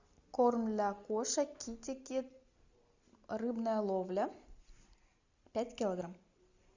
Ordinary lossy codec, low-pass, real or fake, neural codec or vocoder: Opus, 64 kbps; 7.2 kHz; real; none